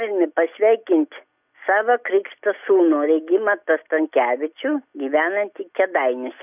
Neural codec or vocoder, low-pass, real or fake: none; 3.6 kHz; real